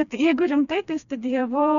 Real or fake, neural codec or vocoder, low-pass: fake; codec, 16 kHz, 2 kbps, FreqCodec, smaller model; 7.2 kHz